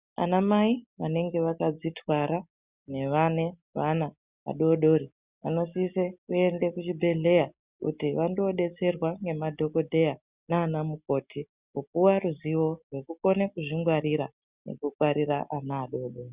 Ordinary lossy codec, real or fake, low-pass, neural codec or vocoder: Opus, 64 kbps; real; 3.6 kHz; none